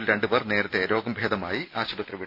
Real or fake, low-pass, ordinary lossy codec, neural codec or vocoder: real; 5.4 kHz; none; none